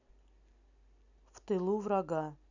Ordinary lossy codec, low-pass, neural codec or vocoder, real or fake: none; 7.2 kHz; none; real